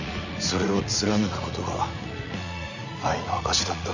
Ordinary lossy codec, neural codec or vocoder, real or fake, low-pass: none; vocoder, 44.1 kHz, 80 mel bands, Vocos; fake; 7.2 kHz